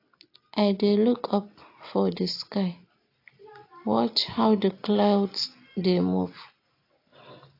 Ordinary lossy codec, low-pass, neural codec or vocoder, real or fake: AAC, 32 kbps; 5.4 kHz; none; real